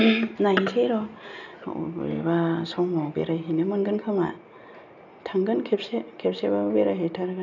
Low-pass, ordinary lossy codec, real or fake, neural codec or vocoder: 7.2 kHz; none; real; none